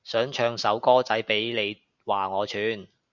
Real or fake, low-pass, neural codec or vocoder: real; 7.2 kHz; none